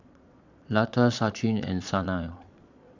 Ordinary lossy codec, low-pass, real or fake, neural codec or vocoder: none; 7.2 kHz; fake; vocoder, 22.05 kHz, 80 mel bands, Vocos